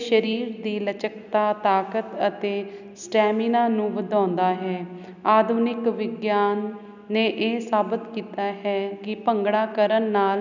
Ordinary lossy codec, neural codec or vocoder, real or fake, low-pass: none; none; real; 7.2 kHz